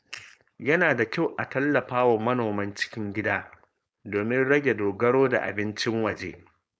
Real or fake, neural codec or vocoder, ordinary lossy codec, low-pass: fake; codec, 16 kHz, 4.8 kbps, FACodec; none; none